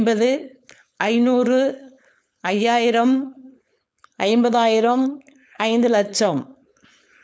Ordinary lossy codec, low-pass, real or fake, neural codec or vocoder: none; none; fake; codec, 16 kHz, 4.8 kbps, FACodec